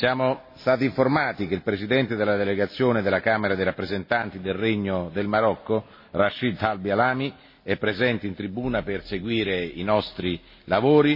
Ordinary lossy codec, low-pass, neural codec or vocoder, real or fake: MP3, 24 kbps; 5.4 kHz; none; real